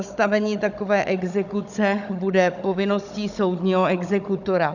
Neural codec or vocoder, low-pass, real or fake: codec, 16 kHz, 16 kbps, FunCodec, trained on Chinese and English, 50 frames a second; 7.2 kHz; fake